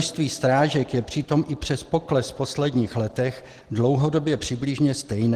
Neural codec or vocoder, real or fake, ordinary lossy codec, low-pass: none; real; Opus, 16 kbps; 14.4 kHz